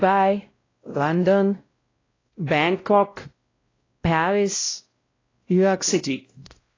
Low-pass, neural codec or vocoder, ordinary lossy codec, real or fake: 7.2 kHz; codec, 16 kHz, 0.5 kbps, X-Codec, WavLM features, trained on Multilingual LibriSpeech; AAC, 32 kbps; fake